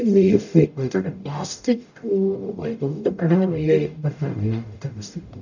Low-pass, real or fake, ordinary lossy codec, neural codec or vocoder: 7.2 kHz; fake; none; codec, 44.1 kHz, 0.9 kbps, DAC